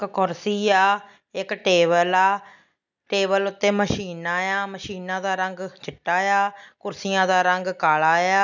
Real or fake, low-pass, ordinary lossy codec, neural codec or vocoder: real; 7.2 kHz; none; none